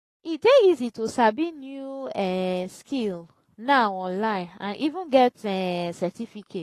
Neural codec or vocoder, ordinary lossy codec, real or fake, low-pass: codec, 44.1 kHz, 7.8 kbps, Pupu-Codec; AAC, 48 kbps; fake; 14.4 kHz